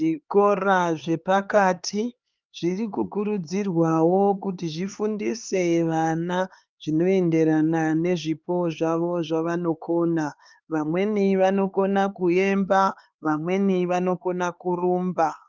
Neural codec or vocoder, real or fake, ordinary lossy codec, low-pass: codec, 16 kHz, 4 kbps, X-Codec, HuBERT features, trained on LibriSpeech; fake; Opus, 32 kbps; 7.2 kHz